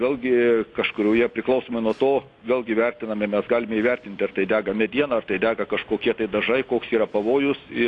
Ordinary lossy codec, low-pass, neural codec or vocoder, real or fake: AAC, 48 kbps; 10.8 kHz; none; real